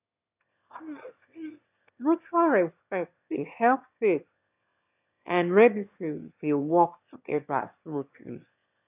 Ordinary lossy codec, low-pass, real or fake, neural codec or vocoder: none; 3.6 kHz; fake; autoencoder, 22.05 kHz, a latent of 192 numbers a frame, VITS, trained on one speaker